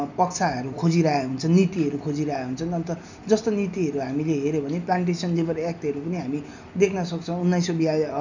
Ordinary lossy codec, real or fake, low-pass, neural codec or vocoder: none; real; 7.2 kHz; none